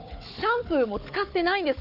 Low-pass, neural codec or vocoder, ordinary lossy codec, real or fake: 5.4 kHz; codec, 16 kHz, 4 kbps, FunCodec, trained on Chinese and English, 50 frames a second; none; fake